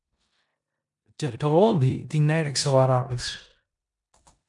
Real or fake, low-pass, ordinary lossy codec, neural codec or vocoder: fake; 10.8 kHz; MP3, 96 kbps; codec, 16 kHz in and 24 kHz out, 0.9 kbps, LongCat-Audio-Codec, four codebook decoder